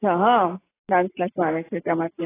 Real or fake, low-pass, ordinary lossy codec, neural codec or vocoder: real; 3.6 kHz; AAC, 16 kbps; none